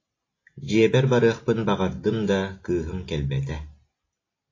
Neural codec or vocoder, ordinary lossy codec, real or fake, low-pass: none; AAC, 32 kbps; real; 7.2 kHz